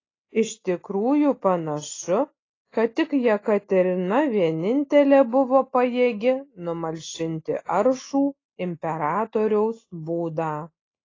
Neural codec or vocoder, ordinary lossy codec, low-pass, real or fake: none; AAC, 32 kbps; 7.2 kHz; real